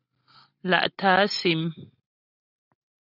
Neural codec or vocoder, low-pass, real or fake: none; 5.4 kHz; real